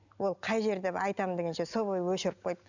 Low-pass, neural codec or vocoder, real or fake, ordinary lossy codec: 7.2 kHz; none; real; none